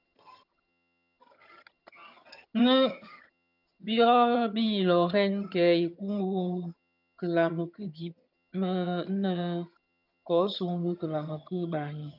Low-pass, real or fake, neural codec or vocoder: 5.4 kHz; fake; vocoder, 22.05 kHz, 80 mel bands, HiFi-GAN